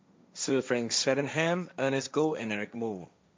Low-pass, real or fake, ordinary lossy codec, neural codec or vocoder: none; fake; none; codec, 16 kHz, 1.1 kbps, Voila-Tokenizer